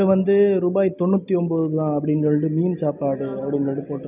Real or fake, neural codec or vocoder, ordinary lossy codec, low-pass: real; none; none; 3.6 kHz